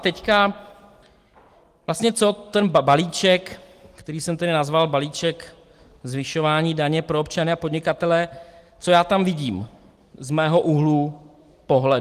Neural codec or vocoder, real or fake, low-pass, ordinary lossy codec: none; real; 14.4 kHz; Opus, 24 kbps